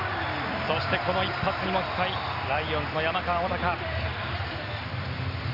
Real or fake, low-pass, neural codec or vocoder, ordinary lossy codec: real; 5.4 kHz; none; none